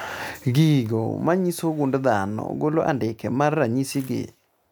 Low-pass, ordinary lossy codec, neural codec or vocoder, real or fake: none; none; none; real